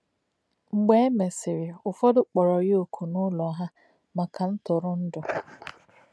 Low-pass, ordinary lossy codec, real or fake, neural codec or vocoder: none; none; real; none